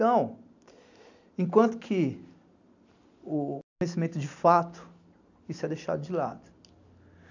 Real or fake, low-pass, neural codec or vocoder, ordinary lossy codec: real; 7.2 kHz; none; none